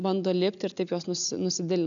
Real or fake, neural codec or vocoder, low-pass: real; none; 7.2 kHz